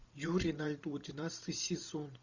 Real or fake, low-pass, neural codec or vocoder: fake; 7.2 kHz; vocoder, 44.1 kHz, 128 mel bands every 512 samples, BigVGAN v2